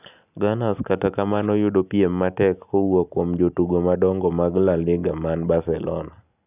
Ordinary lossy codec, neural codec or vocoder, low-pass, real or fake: none; none; 3.6 kHz; real